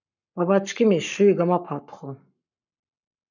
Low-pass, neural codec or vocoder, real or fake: 7.2 kHz; codec, 44.1 kHz, 7.8 kbps, Pupu-Codec; fake